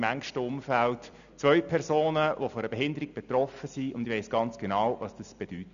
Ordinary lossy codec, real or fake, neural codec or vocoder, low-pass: none; real; none; 7.2 kHz